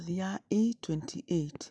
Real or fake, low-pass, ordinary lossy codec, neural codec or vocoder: real; none; none; none